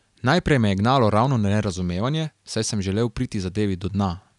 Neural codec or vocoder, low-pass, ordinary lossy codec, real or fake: none; 10.8 kHz; none; real